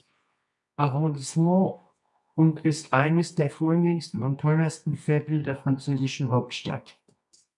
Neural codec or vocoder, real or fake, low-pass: codec, 24 kHz, 0.9 kbps, WavTokenizer, medium music audio release; fake; 10.8 kHz